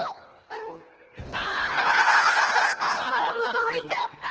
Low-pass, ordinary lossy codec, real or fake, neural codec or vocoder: 7.2 kHz; Opus, 16 kbps; fake; codec, 24 kHz, 1.5 kbps, HILCodec